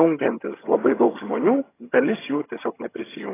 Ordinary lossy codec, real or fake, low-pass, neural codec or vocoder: AAC, 16 kbps; fake; 3.6 kHz; vocoder, 22.05 kHz, 80 mel bands, HiFi-GAN